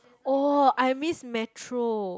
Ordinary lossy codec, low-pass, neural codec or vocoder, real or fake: none; none; none; real